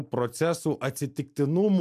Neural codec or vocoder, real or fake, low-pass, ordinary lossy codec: none; real; 14.4 kHz; MP3, 96 kbps